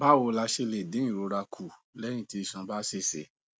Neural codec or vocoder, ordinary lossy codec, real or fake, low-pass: none; none; real; none